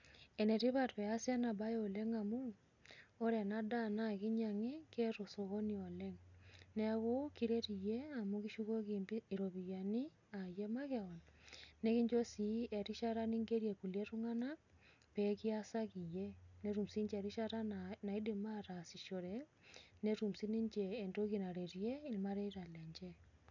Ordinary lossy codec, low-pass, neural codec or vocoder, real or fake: none; 7.2 kHz; none; real